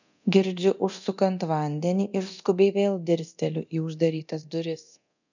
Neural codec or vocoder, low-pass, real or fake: codec, 24 kHz, 0.9 kbps, DualCodec; 7.2 kHz; fake